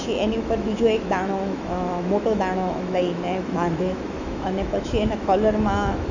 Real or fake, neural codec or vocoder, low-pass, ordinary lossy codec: fake; autoencoder, 48 kHz, 128 numbers a frame, DAC-VAE, trained on Japanese speech; 7.2 kHz; none